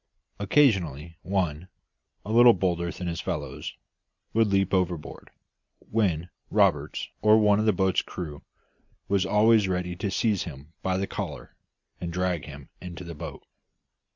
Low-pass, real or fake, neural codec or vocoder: 7.2 kHz; real; none